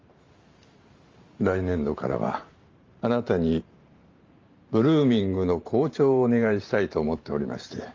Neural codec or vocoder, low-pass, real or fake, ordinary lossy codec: vocoder, 44.1 kHz, 128 mel bands every 512 samples, BigVGAN v2; 7.2 kHz; fake; Opus, 32 kbps